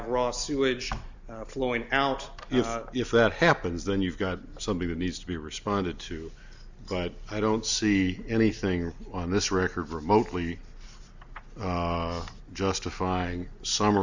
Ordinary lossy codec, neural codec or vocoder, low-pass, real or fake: Opus, 64 kbps; none; 7.2 kHz; real